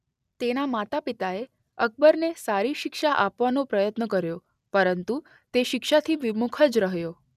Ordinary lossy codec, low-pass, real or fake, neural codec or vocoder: none; 14.4 kHz; real; none